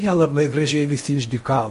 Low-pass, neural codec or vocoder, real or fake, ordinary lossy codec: 10.8 kHz; codec, 16 kHz in and 24 kHz out, 0.8 kbps, FocalCodec, streaming, 65536 codes; fake; MP3, 48 kbps